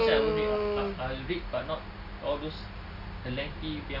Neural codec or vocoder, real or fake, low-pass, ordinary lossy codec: none; real; 5.4 kHz; Opus, 64 kbps